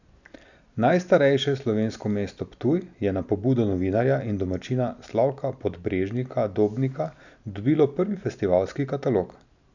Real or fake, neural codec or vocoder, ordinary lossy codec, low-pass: real; none; none; 7.2 kHz